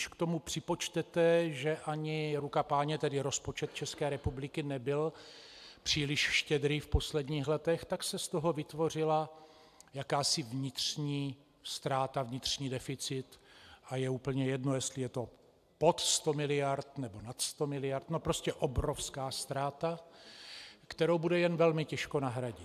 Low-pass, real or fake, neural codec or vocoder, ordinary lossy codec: 14.4 kHz; real; none; AAC, 96 kbps